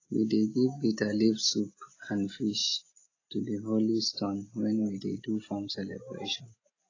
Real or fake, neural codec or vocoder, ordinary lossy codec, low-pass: real; none; AAC, 32 kbps; 7.2 kHz